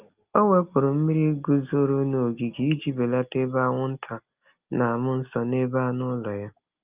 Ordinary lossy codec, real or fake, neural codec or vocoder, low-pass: Opus, 24 kbps; real; none; 3.6 kHz